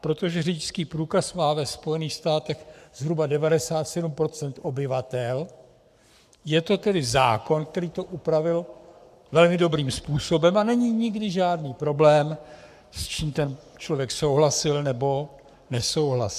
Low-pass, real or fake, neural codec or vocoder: 14.4 kHz; fake; codec, 44.1 kHz, 7.8 kbps, Pupu-Codec